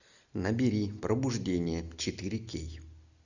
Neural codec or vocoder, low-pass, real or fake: none; 7.2 kHz; real